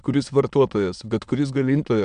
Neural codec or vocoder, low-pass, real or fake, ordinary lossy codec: autoencoder, 22.05 kHz, a latent of 192 numbers a frame, VITS, trained on many speakers; 9.9 kHz; fake; AAC, 96 kbps